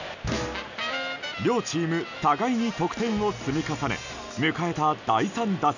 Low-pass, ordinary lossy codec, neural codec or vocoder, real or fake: 7.2 kHz; none; none; real